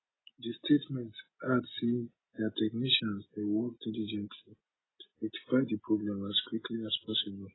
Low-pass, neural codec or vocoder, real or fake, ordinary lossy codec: 7.2 kHz; none; real; AAC, 16 kbps